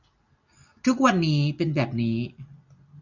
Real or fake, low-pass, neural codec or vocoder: real; 7.2 kHz; none